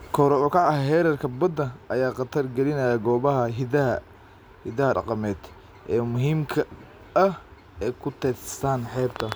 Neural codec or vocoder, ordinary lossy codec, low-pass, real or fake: none; none; none; real